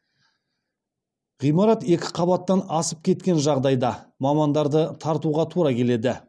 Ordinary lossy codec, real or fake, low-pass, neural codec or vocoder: none; real; none; none